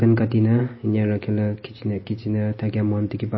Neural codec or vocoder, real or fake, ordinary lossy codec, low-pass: none; real; MP3, 24 kbps; 7.2 kHz